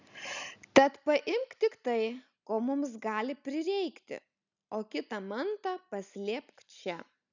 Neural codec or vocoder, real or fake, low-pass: none; real; 7.2 kHz